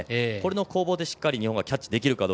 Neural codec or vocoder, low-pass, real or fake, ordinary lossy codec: none; none; real; none